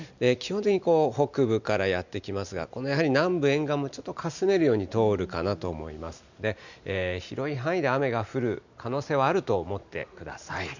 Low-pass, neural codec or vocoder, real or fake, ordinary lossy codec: 7.2 kHz; none; real; none